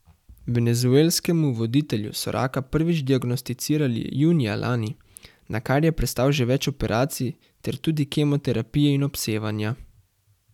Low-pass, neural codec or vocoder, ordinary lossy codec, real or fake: 19.8 kHz; none; none; real